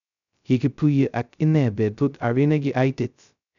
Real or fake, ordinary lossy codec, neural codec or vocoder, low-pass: fake; none; codec, 16 kHz, 0.2 kbps, FocalCodec; 7.2 kHz